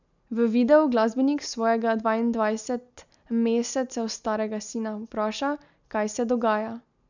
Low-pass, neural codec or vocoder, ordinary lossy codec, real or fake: 7.2 kHz; none; none; real